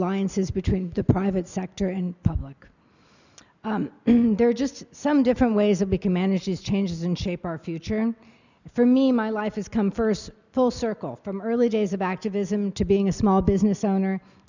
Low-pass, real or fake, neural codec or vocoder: 7.2 kHz; real; none